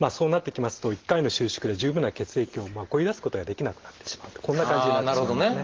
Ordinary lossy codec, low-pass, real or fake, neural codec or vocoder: Opus, 16 kbps; 7.2 kHz; real; none